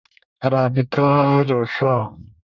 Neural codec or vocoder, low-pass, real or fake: codec, 24 kHz, 1 kbps, SNAC; 7.2 kHz; fake